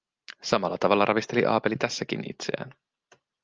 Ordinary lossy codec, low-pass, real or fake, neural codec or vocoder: Opus, 24 kbps; 7.2 kHz; real; none